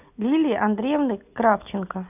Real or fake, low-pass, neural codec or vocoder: real; 3.6 kHz; none